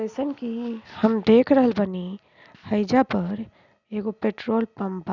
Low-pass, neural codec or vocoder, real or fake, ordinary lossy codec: 7.2 kHz; none; real; none